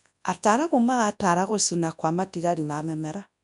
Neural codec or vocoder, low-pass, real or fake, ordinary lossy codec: codec, 24 kHz, 0.9 kbps, WavTokenizer, large speech release; 10.8 kHz; fake; none